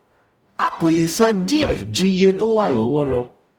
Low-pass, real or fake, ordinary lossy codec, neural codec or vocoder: 19.8 kHz; fake; Opus, 64 kbps; codec, 44.1 kHz, 0.9 kbps, DAC